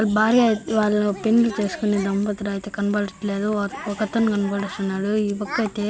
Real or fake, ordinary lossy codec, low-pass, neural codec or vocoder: real; none; none; none